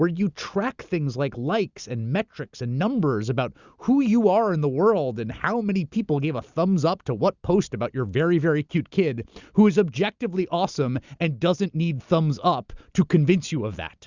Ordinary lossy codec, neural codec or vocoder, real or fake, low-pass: Opus, 64 kbps; none; real; 7.2 kHz